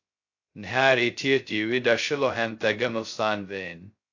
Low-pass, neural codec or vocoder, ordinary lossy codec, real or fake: 7.2 kHz; codec, 16 kHz, 0.2 kbps, FocalCodec; AAC, 48 kbps; fake